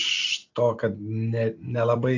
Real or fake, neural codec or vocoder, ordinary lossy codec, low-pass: real; none; AAC, 48 kbps; 7.2 kHz